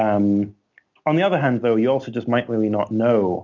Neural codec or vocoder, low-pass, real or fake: none; 7.2 kHz; real